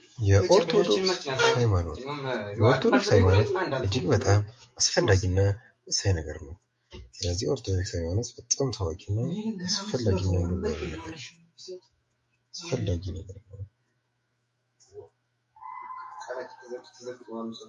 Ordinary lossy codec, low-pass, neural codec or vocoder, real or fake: MP3, 48 kbps; 14.4 kHz; autoencoder, 48 kHz, 128 numbers a frame, DAC-VAE, trained on Japanese speech; fake